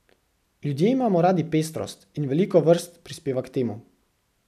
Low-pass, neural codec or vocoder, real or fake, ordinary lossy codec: 14.4 kHz; none; real; none